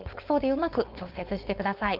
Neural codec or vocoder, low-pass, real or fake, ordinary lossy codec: codec, 16 kHz, 4.8 kbps, FACodec; 5.4 kHz; fake; Opus, 24 kbps